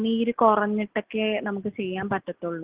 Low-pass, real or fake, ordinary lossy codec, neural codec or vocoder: 3.6 kHz; real; Opus, 16 kbps; none